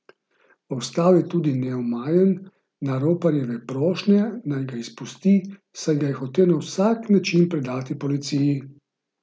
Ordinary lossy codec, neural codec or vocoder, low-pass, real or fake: none; none; none; real